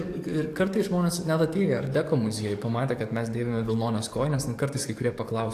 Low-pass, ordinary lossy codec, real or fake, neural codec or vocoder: 14.4 kHz; AAC, 64 kbps; fake; codec, 44.1 kHz, 7.8 kbps, DAC